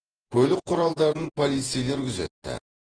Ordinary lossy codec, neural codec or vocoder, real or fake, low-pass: Opus, 16 kbps; vocoder, 48 kHz, 128 mel bands, Vocos; fake; 9.9 kHz